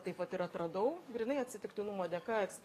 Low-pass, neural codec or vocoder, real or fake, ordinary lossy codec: 14.4 kHz; codec, 44.1 kHz, 7.8 kbps, DAC; fake; AAC, 48 kbps